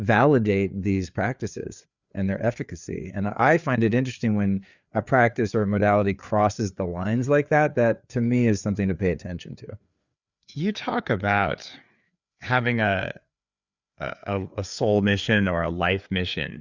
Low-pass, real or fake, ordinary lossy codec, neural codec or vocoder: 7.2 kHz; fake; Opus, 64 kbps; codec, 16 kHz, 4 kbps, FreqCodec, larger model